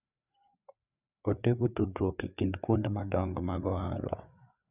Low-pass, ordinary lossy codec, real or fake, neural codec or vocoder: 3.6 kHz; none; fake; codec, 16 kHz, 4 kbps, FreqCodec, larger model